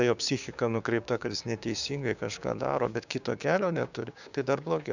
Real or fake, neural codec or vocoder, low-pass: fake; codec, 16 kHz, 6 kbps, DAC; 7.2 kHz